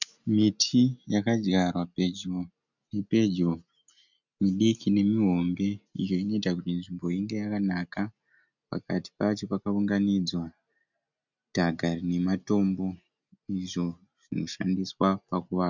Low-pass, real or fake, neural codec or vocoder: 7.2 kHz; real; none